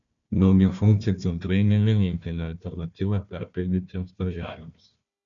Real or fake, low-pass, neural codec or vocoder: fake; 7.2 kHz; codec, 16 kHz, 1 kbps, FunCodec, trained on Chinese and English, 50 frames a second